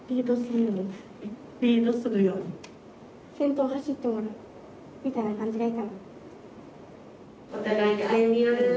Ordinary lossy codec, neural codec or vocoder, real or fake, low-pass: none; none; real; none